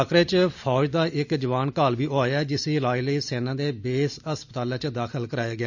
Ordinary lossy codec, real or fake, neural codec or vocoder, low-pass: none; real; none; 7.2 kHz